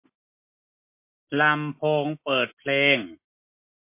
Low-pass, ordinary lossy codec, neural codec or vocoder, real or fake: 3.6 kHz; MP3, 24 kbps; none; real